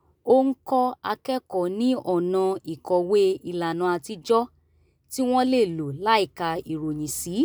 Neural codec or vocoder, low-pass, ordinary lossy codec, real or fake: none; none; none; real